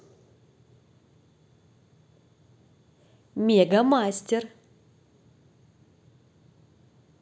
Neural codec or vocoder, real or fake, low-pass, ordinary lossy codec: none; real; none; none